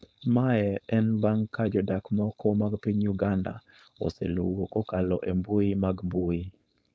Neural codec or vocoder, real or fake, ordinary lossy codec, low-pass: codec, 16 kHz, 4.8 kbps, FACodec; fake; none; none